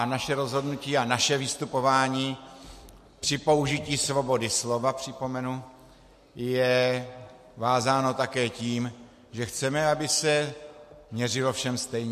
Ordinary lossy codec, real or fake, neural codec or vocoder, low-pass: MP3, 64 kbps; real; none; 14.4 kHz